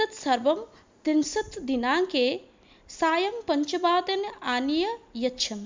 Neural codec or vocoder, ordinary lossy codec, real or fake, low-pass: none; MP3, 64 kbps; real; 7.2 kHz